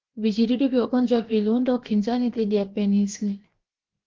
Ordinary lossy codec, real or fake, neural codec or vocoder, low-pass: Opus, 16 kbps; fake; codec, 16 kHz, 0.7 kbps, FocalCodec; 7.2 kHz